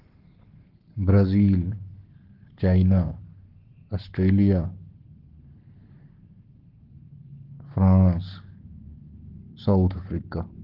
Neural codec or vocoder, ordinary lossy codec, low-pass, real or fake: codec, 44.1 kHz, 7.8 kbps, Pupu-Codec; Opus, 16 kbps; 5.4 kHz; fake